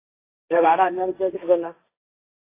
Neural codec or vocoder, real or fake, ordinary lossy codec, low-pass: codec, 16 kHz, 1.1 kbps, Voila-Tokenizer; fake; AAC, 24 kbps; 3.6 kHz